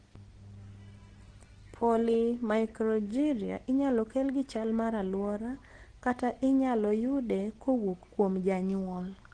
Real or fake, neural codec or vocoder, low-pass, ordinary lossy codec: real; none; 9.9 kHz; Opus, 16 kbps